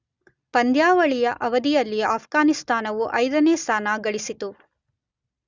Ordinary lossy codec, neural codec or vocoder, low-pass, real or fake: Opus, 64 kbps; none; 7.2 kHz; real